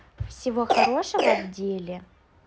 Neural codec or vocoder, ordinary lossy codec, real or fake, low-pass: none; none; real; none